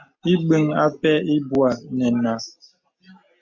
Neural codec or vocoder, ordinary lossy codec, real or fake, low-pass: none; MP3, 64 kbps; real; 7.2 kHz